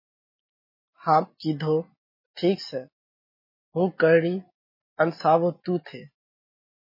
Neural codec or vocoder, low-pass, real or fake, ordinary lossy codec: none; 5.4 kHz; real; MP3, 24 kbps